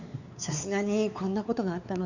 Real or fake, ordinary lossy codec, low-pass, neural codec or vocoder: fake; none; 7.2 kHz; codec, 16 kHz, 4 kbps, X-Codec, WavLM features, trained on Multilingual LibriSpeech